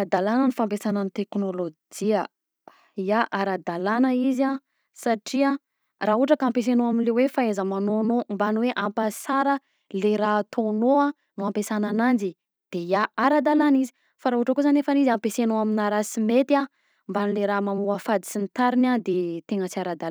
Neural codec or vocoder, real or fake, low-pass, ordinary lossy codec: vocoder, 44.1 kHz, 128 mel bands every 256 samples, BigVGAN v2; fake; none; none